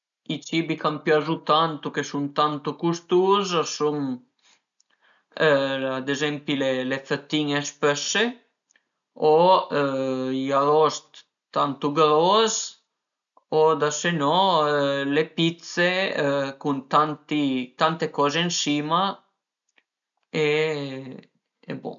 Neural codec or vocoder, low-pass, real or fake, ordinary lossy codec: none; 7.2 kHz; real; none